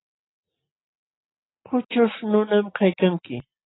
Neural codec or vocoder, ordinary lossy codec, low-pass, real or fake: none; AAC, 16 kbps; 7.2 kHz; real